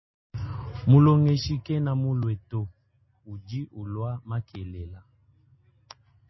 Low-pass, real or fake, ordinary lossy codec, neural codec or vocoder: 7.2 kHz; real; MP3, 24 kbps; none